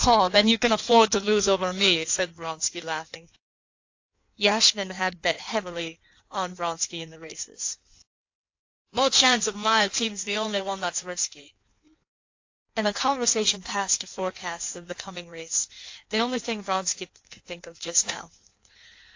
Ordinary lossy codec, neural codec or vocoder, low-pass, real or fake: AAC, 48 kbps; codec, 16 kHz in and 24 kHz out, 1.1 kbps, FireRedTTS-2 codec; 7.2 kHz; fake